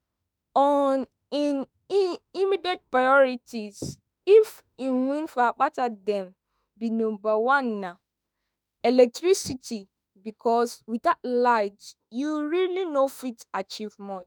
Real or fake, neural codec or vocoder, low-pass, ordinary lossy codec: fake; autoencoder, 48 kHz, 32 numbers a frame, DAC-VAE, trained on Japanese speech; none; none